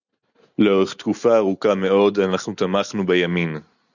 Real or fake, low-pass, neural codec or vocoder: real; 7.2 kHz; none